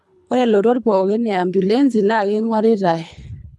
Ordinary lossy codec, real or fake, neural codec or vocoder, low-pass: none; fake; codec, 24 kHz, 3 kbps, HILCodec; none